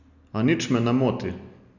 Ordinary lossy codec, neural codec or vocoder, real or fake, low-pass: none; none; real; 7.2 kHz